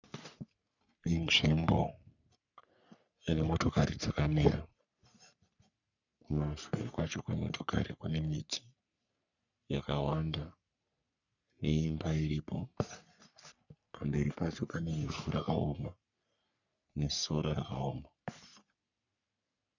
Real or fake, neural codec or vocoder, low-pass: fake; codec, 44.1 kHz, 3.4 kbps, Pupu-Codec; 7.2 kHz